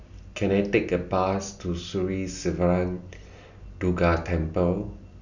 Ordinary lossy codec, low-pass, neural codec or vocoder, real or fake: none; 7.2 kHz; none; real